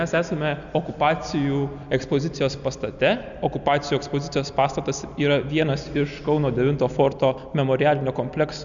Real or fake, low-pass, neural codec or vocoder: real; 7.2 kHz; none